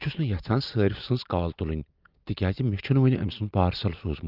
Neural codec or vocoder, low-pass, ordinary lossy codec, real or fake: none; 5.4 kHz; Opus, 24 kbps; real